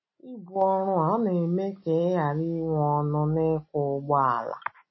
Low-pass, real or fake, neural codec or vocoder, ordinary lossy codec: 7.2 kHz; real; none; MP3, 24 kbps